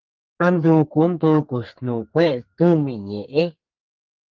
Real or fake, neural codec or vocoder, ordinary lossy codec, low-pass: fake; codec, 32 kHz, 1.9 kbps, SNAC; Opus, 32 kbps; 7.2 kHz